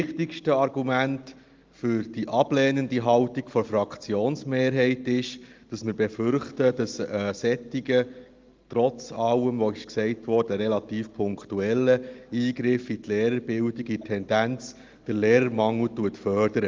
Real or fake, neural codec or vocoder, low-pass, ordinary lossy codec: real; none; 7.2 kHz; Opus, 32 kbps